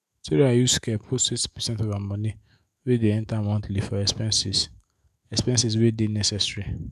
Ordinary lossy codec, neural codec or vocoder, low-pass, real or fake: none; autoencoder, 48 kHz, 128 numbers a frame, DAC-VAE, trained on Japanese speech; 14.4 kHz; fake